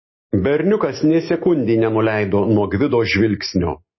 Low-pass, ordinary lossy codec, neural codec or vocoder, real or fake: 7.2 kHz; MP3, 24 kbps; none; real